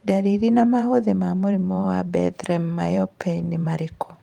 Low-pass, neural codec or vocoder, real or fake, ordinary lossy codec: 19.8 kHz; vocoder, 44.1 kHz, 128 mel bands every 512 samples, BigVGAN v2; fake; Opus, 32 kbps